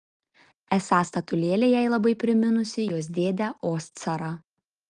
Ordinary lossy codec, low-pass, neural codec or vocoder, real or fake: Opus, 24 kbps; 9.9 kHz; none; real